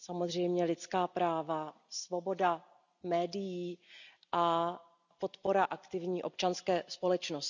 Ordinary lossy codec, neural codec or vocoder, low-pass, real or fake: none; none; 7.2 kHz; real